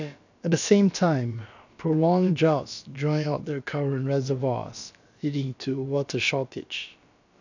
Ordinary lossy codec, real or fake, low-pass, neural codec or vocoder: none; fake; 7.2 kHz; codec, 16 kHz, about 1 kbps, DyCAST, with the encoder's durations